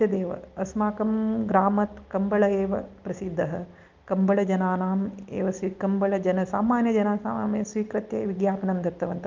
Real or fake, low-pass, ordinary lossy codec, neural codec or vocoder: real; 7.2 kHz; Opus, 32 kbps; none